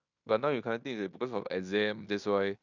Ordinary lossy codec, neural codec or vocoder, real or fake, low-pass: none; codec, 16 kHz in and 24 kHz out, 0.9 kbps, LongCat-Audio-Codec, fine tuned four codebook decoder; fake; 7.2 kHz